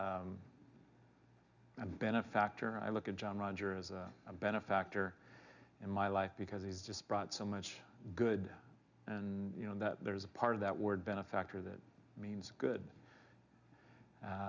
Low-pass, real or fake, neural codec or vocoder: 7.2 kHz; real; none